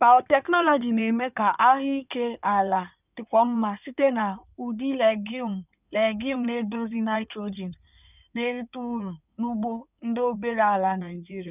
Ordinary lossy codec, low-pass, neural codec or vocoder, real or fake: none; 3.6 kHz; codec, 16 kHz in and 24 kHz out, 2.2 kbps, FireRedTTS-2 codec; fake